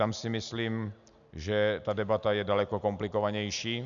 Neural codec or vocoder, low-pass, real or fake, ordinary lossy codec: none; 7.2 kHz; real; MP3, 96 kbps